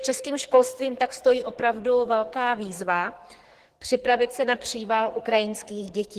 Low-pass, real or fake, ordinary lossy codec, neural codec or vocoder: 14.4 kHz; fake; Opus, 16 kbps; codec, 44.1 kHz, 2.6 kbps, SNAC